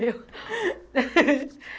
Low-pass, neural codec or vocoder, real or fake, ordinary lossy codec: none; none; real; none